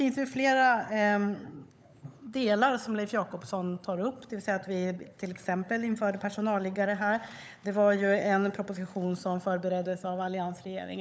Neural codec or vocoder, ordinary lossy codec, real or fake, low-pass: codec, 16 kHz, 16 kbps, FunCodec, trained on LibriTTS, 50 frames a second; none; fake; none